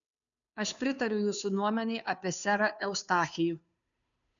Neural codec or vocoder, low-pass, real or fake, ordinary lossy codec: codec, 16 kHz, 2 kbps, FunCodec, trained on Chinese and English, 25 frames a second; 7.2 kHz; fake; MP3, 96 kbps